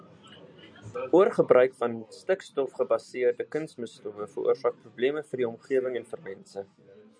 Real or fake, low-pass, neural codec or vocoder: real; 9.9 kHz; none